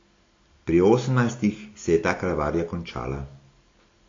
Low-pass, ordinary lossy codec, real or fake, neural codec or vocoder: 7.2 kHz; AAC, 32 kbps; real; none